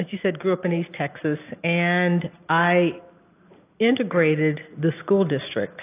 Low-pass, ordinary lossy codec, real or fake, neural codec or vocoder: 3.6 kHz; AAC, 24 kbps; real; none